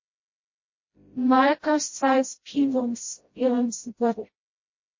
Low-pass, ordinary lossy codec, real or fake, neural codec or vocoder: 7.2 kHz; MP3, 32 kbps; fake; codec, 16 kHz, 0.5 kbps, FreqCodec, smaller model